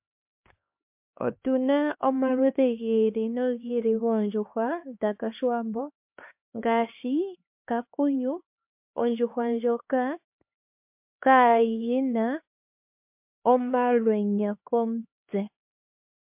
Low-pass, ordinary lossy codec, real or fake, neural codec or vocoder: 3.6 kHz; MP3, 32 kbps; fake; codec, 16 kHz, 2 kbps, X-Codec, HuBERT features, trained on LibriSpeech